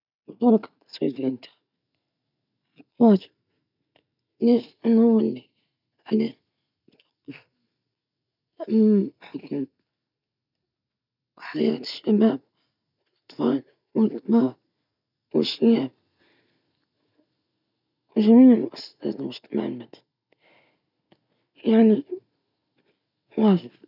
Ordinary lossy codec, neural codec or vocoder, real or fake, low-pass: none; none; real; 5.4 kHz